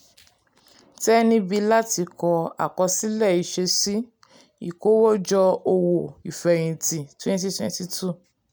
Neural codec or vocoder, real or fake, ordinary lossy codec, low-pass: none; real; none; none